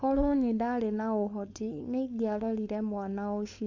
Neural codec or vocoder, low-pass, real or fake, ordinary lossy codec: codec, 16 kHz, 4.8 kbps, FACodec; 7.2 kHz; fake; MP3, 48 kbps